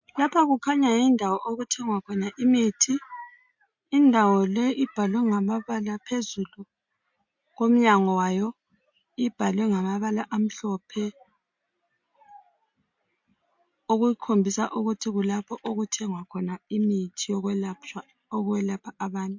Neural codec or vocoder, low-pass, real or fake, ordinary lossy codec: none; 7.2 kHz; real; MP3, 48 kbps